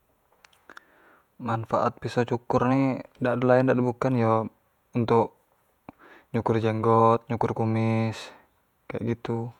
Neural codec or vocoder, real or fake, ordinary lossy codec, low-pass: vocoder, 48 kHz, 128 mel bands, Vocos; fake; none; 19.8 kHz